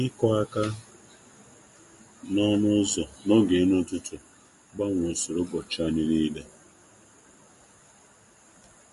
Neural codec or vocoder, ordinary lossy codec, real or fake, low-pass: none; MP3, 48 kbps; real; 14.4 kHz